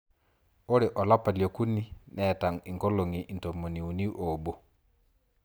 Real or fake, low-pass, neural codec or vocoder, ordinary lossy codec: real; none; none; none